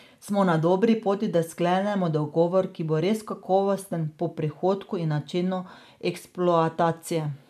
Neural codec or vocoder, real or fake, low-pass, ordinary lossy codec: none; real; 14.4 kHz; none